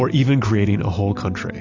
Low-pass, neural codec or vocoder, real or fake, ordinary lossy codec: 7.2 kHz; none; real; AAC, 48 kbps